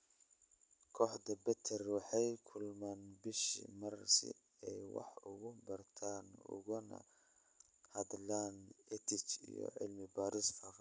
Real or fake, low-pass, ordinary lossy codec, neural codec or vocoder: real; none; none; none